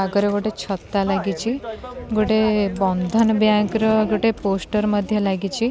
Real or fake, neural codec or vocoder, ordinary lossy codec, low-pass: real; none; none; none